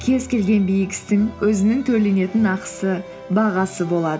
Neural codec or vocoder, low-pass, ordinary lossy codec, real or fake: none; none; none; real